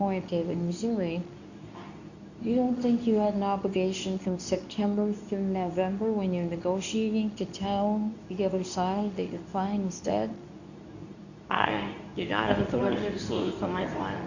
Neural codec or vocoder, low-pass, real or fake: codec, 24 kHz, 0.9 kbps, WavTokenizer, medium speech release version 2; 7.2 kHz; fake